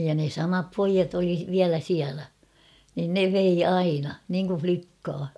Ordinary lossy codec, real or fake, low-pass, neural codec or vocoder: none; real; none; none